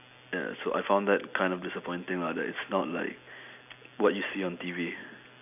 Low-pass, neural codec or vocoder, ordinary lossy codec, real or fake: 3.6 kHz; none; none; real